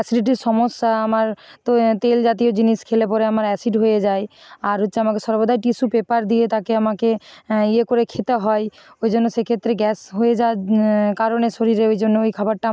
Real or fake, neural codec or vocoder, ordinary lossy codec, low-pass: real; none; none; none